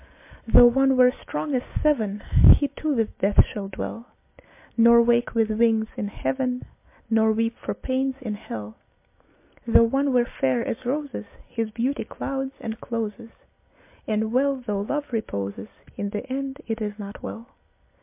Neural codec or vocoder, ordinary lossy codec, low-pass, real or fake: none; MP3, 24 kbps; 3.6 kHz; real